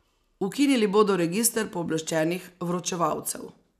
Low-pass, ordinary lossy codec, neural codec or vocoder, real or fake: 14.4 kHz; none; none; real